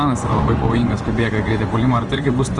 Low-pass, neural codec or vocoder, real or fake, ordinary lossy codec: 10.8 kHz; vocoder, 24 kHz, 100 mel bands, Vocos; fake; Opus, 24 kbps